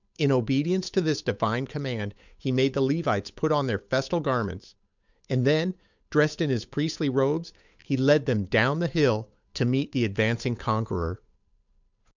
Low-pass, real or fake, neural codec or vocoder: 7.2 kHz; fake; codec, 16 kHz, 8 kbps, FunCodec, trained on Chinese and English, 25 frames a second